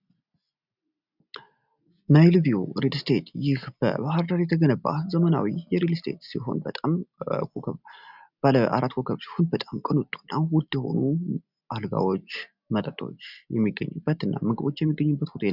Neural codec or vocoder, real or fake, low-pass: none; real; 5.4 kHz